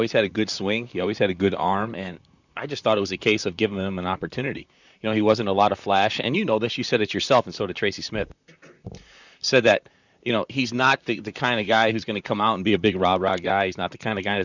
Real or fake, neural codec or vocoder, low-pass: fake; vocoder, 44.1 kHz, 128 mel bands, Pupu-Vocoder; 7.2 kHz